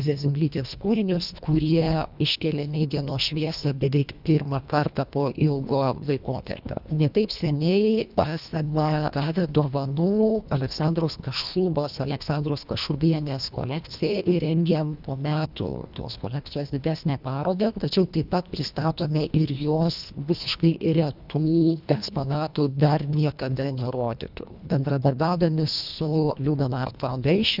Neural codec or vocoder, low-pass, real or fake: codec, 24 kHz, 1.5 kbps, HILCodec; 5.4 kHz; fake